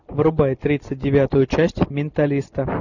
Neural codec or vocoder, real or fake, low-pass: none; real; 7.2 kHz